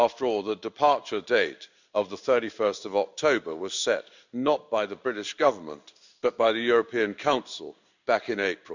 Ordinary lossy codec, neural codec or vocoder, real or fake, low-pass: none; codec, 16 kHz in and 24 kHz out, 1 kbps, XY-Tokenizer; fake; 7.2 kHz